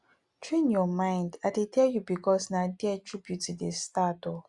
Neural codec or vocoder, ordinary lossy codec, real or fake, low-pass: none; Opus, 64 kbps; real; 10.8 kHz